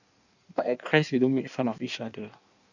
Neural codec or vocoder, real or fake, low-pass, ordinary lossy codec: codec, 16 kHz in and 24 kHz out, 1.1 kbps, FireRedTTS-2 codec; fake; 7.2 kHz; none